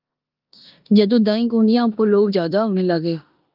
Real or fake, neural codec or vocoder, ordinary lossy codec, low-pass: fake; codec, 16 kHz in and 24 kHz out, 0.9 kbps, LongCat-Audio-Codec, four codebook decoder; Opus, 24 kbps; 5.4 kHz